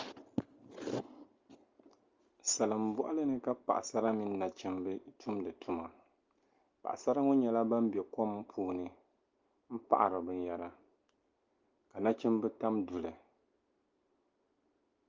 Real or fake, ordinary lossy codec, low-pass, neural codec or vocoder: real; Opus, 32 kbps; 7.2 kHz; none